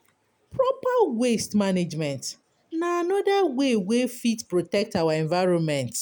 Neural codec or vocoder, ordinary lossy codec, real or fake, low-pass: none; none; real; none